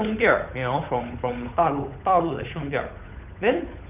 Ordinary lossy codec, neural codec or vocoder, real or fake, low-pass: none; codec, 16 kHz, 8 kbps, FunCodec, trained on Chinese and English, 25 frames a second; fake; 3.6 kHz